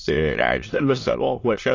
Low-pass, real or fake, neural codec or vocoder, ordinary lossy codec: 7.2 kHz; fake; autoencoder, 22.05 kHz, a latent of 192 numbers a frame, VITS, trained on many speakers; AAC, 32 kbps